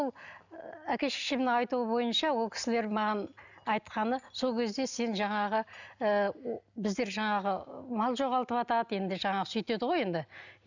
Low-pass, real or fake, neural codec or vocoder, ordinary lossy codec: 7.2 kHz; real; none; none